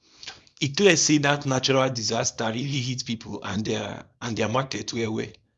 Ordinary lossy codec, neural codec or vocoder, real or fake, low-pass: none; codec, 24 kHz, 0.9 kbps, WavTokenizer, small release; fake; none